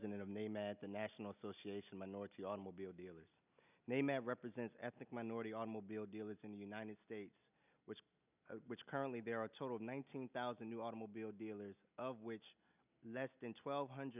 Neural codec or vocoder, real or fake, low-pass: none; real; 3.6 kHz